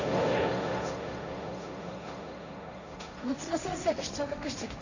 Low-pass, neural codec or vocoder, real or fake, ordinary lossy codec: 7.2 kHz; codec, 16 kHz, 1.1 kbps, Voila-Tokenizer; fake; none